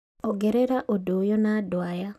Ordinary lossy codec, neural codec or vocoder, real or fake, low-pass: none; none; real; 14.4 kHz